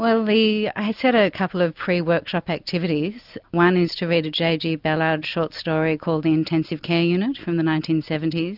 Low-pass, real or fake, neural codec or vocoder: 5.4 kHz; real; none